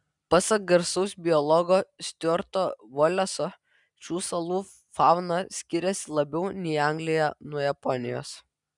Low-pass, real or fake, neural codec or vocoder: 10.8 kHz; real; none